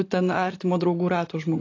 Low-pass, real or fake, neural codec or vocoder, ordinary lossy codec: 7.2 kHz; real; none; AAC, 32 kbps